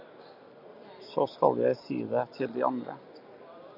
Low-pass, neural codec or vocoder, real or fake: 5.4 kHz; none; real